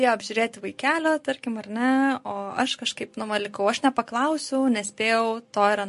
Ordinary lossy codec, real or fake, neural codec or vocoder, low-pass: MP3, 48 kbps; real; none; 14.4 kHz